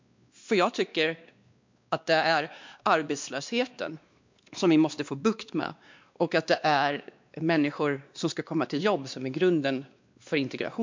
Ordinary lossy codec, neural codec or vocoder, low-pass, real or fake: MP3, 64 kbps; codec, 16 kHz, 2 kbps, X-Codec, WavLM features, trained on Multilingual LibriSpeech; 7.2 kHz; fake